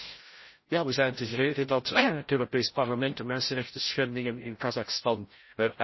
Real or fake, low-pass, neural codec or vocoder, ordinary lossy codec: fake; 7.2 kHz; codec, 16 kHz, 0.5 kbps, FreqCodec, larger model; MP3, 24 kbps